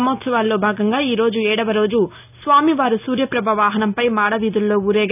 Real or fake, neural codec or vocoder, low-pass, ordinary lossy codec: real; none; 3.6 kHz; none